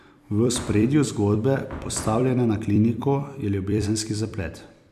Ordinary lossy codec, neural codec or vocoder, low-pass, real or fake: none; vocoder, 44.1 kHz, 128 mel bands every 256 samples, BigVGAN v2; 14.4 kHz; fake